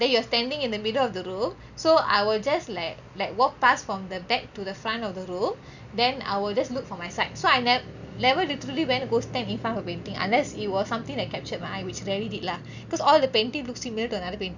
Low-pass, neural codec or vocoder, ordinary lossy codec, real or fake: 7.2 kHz; none; none; real